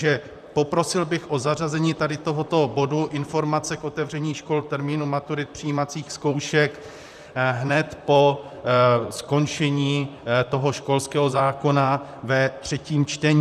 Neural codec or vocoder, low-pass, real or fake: vocoder, 44.1 kHz, 128 mel bands, Pupu-Vocoder; 14.4 kHz; fake